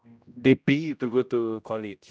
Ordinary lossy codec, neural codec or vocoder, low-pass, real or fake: none; codec, 16 kHz, 0.5 kbps, X-Codec, HuBERT features, trained on general audio; none; fake